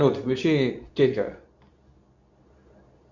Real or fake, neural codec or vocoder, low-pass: fake; codec, 24 kHz, 0.9 kbps, WavTokenizer, medium speech release version 2; 7.2 kHz